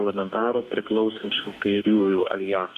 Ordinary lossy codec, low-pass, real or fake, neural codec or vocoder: MP3, 96 kbps; 14.4 kHz; fake; codec, 44.1 kHz, 2.6 kbps, DAC